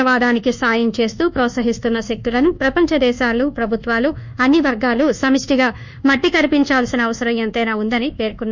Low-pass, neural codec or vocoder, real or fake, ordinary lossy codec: 7.2 kHz; codec, 24 kHz, 1.2 kbps, DualCodec; fake; none